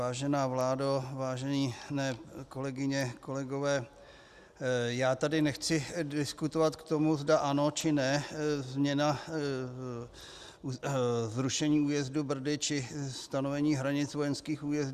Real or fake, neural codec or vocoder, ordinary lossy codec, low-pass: real; none; Opus, 64 kbps; 14.4 kHz